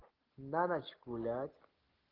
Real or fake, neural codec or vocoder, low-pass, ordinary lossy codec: real; none; 5.4 kHz; Opus, 16 kbps